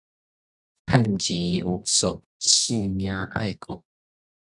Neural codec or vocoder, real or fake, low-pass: codec, 24 kHz, 0.9 kbps, WavTokenizer, medium music audio release; fake; 10.8 kHz